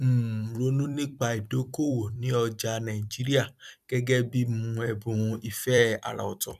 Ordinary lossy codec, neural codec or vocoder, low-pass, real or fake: none; vocoder, 44.1 kHz, 128 mel bands every 256 samples, BigVGAN v2; 14.4 kHz; fake